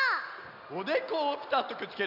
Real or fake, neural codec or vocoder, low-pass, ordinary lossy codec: real; none; 5.4 kHz; none